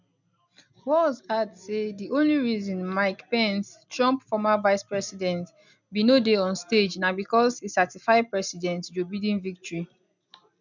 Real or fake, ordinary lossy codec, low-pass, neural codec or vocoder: real; none; 7.2 kHz; none